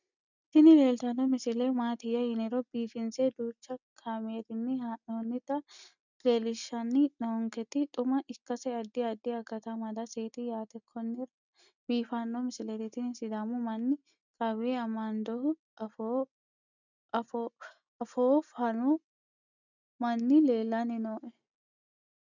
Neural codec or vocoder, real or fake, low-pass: none; real; 7.2 kHz